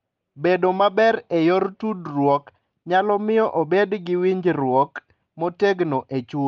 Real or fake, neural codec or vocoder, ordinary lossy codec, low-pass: real; none; Opus, 32 kbps; 7.2 kHz